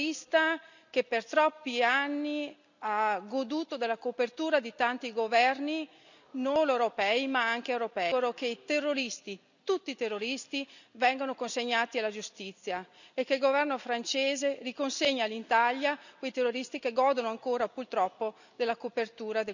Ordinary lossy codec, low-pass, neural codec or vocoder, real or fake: none; 7.2 kHz; none; real